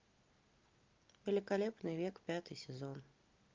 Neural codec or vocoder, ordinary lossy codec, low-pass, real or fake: none; Opus, 32 kbps; 7.2 kHz; real